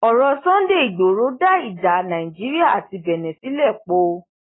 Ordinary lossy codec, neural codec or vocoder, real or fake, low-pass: AAC, 16 kbps; none; real; 7.2 kHz